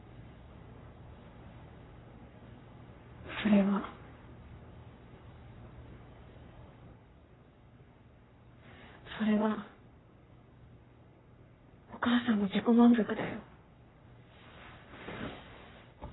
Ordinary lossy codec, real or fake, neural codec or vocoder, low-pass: AAC, 16 kbps; fake; codec, 44.1 kHz, 3.4 kbps, Pupu-Codec; 7.2 kHz